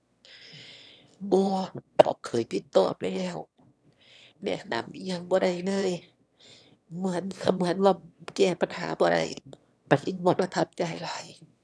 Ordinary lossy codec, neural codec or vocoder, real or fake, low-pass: none; autoencoder, 22.05 kHz, a latent of 192 numbers a frame, VITS, trained on one speaker; fake; none